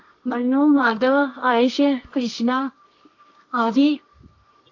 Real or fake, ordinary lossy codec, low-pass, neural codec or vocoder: fake; AAC, 48 kbps; 7.2 kHz; codec, 24 kHz, 0.9 kbps, WavTokenizer, medium music audio release